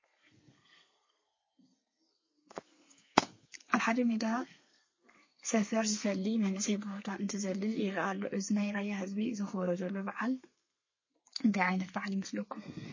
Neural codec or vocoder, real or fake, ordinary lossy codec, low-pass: codec, 32 kHz, 1.9 kbps, SNAC; fake; MP3, 32 kbps; 7.2 kHz